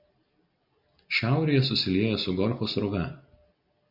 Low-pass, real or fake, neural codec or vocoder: 5.4 kHz; real; none